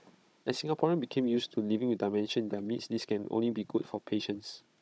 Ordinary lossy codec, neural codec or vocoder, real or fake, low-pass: none; codec, 16 kHz, 16 kbps, FunCodec, trained on Chinese and English, 50 frames a second; fake; none